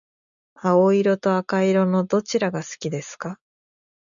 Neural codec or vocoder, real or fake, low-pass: none; real; 7.2 kHz